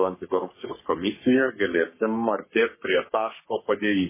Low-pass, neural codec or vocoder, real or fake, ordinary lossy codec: 3.6 kHz; codec, 44.1 kHz, 3.4 kbps, Pupu-Codec; fake; MP3, 16 kbps